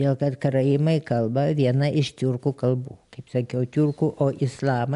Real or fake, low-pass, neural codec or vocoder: real; 10.8 kHz; none